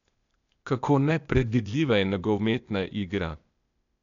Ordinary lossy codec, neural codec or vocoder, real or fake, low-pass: none; codec, 16 kHz, 0.8 kbps, ZipCodec; fake; 7.2 kHz